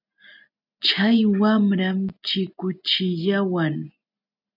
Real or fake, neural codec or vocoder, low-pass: real; none; 5.4 kHz